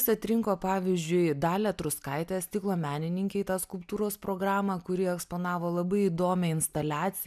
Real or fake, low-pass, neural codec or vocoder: real; 14.4 kHz; none